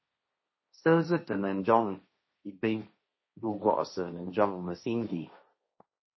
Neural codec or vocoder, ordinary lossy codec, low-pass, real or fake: codec, 16 kHz, 1.1 kbps, Voila-Tokenizer; MP3, 24 kbps; 7.2 kHz; fake